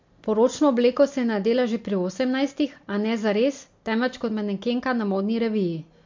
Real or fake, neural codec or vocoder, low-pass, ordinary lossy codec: real; none; 7.2 kHz; MP3, 48 kbps